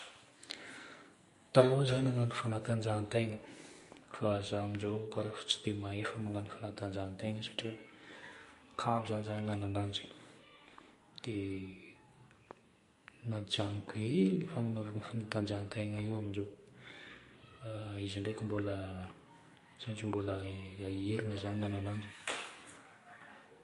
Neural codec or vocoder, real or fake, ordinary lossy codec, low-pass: codec, 44.1 kHz, 2.6 kbps, SNAC; fake; MP3, 48 kbps; 14.4 kHz